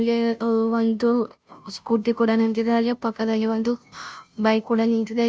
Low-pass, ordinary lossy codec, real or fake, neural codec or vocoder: none; none; fake; codec, 16 kHz, 0.5 kbps, FunCodec, trained on Chinese and English, 25 frames a second